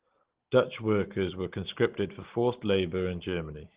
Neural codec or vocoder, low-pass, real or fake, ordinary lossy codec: none; 3.6 kHz; real; Opus, 16 kbps